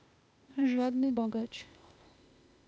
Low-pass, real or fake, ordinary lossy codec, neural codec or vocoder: none; fake; none; codec, 16 kHz, 0.8 kbps, ZipCodec